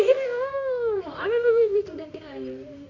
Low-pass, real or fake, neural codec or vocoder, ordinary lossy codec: 7.2 kHz; fake; codec, 24 kHz, 0.9 kbps, WavTokenizer, medium music audio release; none